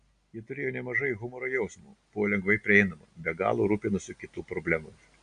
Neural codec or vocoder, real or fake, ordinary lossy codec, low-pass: none; real; Opus, 64 kbps; 9.9 kHz